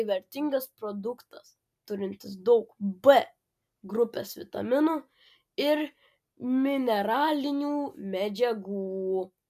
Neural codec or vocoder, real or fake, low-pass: none; real; 14.4 kHz